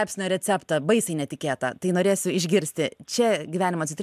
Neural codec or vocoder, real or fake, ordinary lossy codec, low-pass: none; real; MP3, 96 kbps; 14.4 kHz